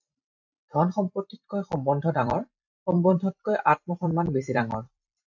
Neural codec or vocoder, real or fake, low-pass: vocoder, 44.1 kHz, 128 mel bands every 256 samples, BigVGAN v2; fake; 7.2 kHz